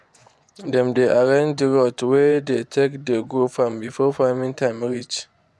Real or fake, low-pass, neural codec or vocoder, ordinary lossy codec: fake; none; vocoder, 24 kHz, 100 mel bands, Vocos; none